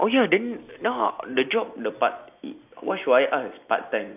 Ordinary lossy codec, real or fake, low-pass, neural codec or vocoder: AAC, 32 kbps; real; 3.6 kHz; none